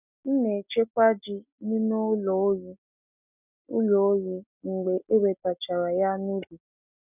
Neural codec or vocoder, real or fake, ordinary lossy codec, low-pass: none; real; none; 3.6 kHz